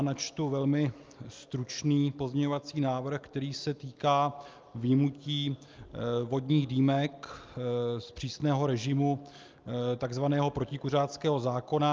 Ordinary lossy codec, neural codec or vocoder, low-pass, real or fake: Opus, 24 kbps; none; 7.2 kHz; real